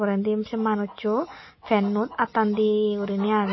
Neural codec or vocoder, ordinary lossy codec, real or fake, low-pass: none; MP3, 24 kbps; real; 7.2 kHz